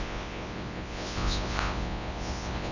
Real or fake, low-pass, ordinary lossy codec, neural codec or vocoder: fake; 7.2 kHz; none; codec, 24 kHz, 0.9 kbps, WavTokenizer, large speech release